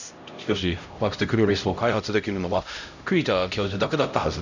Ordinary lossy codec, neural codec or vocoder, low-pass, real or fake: none; codec, 16 kHz, 0.5 kbps, X-Codec, HuBERT features, trained on LibriSpeech; 7.2 kHz; fake